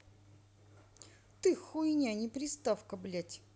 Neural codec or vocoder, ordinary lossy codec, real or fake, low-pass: none; none; real; none